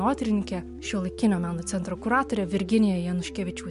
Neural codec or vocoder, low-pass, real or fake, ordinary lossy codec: none; 10.8 kHz; real; MP3, 64 kbps